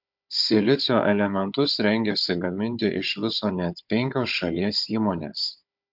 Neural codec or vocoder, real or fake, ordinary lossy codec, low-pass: codec, 16 kHz, 16 kbps, FunCodec, trained on Chinese and English, 50 frames a second; fake; MP3, 48 kbps; 5.4 kHz